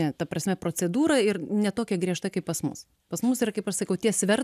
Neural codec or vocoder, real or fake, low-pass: none; real; 14.4 kHz